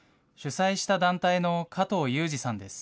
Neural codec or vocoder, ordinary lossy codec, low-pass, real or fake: none; none; none; real